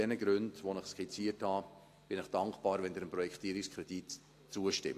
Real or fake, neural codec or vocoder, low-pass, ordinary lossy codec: real; none; 14.4 kHz; AAC, 64 kbps